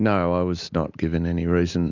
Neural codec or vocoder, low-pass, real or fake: none; 7.2 kHz; real